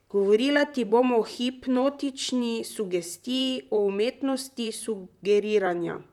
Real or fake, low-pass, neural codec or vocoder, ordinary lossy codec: fake; 19.8 kHz; vocoder, 44.1 kHz, 128 mel bands, Pupu-Vocoder; none